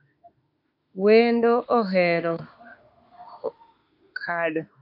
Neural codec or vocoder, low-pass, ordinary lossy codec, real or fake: autoencoder, 48 kHz, 32 numbers a frame, DAC-VAE, trained on Japanese speech; 5.4 kHz; AAC, 48 kbps; fake